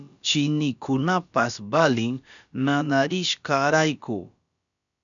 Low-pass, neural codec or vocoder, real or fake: 7.2 kHz; codec, 16 kHz, about 1 kbps, DyCAST, with the encoder's durations; fake